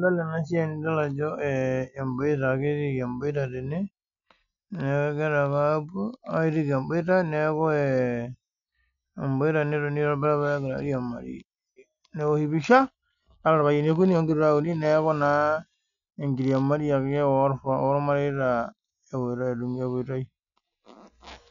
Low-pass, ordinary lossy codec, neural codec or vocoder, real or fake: 7.2 kHz; none; none; real